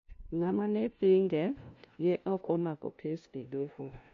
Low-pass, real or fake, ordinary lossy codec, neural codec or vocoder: 7.2 kHz; fake; AAC, 48 kbps; codec, 16 kHz, 0.5 kbps, FunCodec, trained on LibriTTS, 25 frames a second